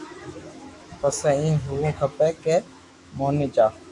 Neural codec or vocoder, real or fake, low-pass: autoencoder, 48 kHz, 128 numbers a frame, DAC-VAE, trained on Japanese speech; fake; 10.8 kHz